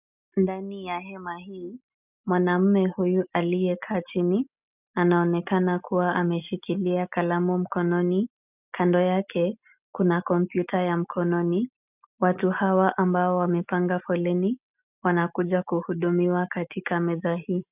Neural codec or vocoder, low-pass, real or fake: none; 3.6 kHz; real